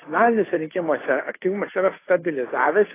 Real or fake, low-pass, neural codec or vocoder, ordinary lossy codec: fake; 3.6 kHz; codec, 16 kHz, 2 kbps, FunCodec, trained on Chinese and English, 25 frames a second; AAC, 16 kbps